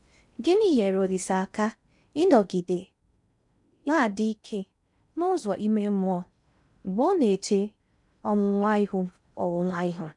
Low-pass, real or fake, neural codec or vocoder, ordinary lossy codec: 10.8 kHz; fake; codec, 16 kHz in and 24 kHz out, 0.6 kbps, FocalCodec, streaming, 4096 codes; none